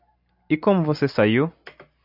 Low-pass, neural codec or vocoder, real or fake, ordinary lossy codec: 5.4 kHz; none; real; AAC, 48 kbps